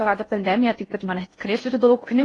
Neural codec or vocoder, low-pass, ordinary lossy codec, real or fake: codec, 16 kHz in and 24 kHz out, 0.6 kbps, FocalCodec, streaming, 4096 codes; 10.8 kHz; AAC, 32 kbps; fake